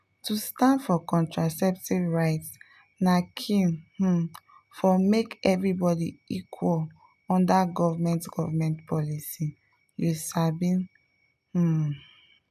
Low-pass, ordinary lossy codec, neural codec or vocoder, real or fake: 14.4 kHz; none; none; real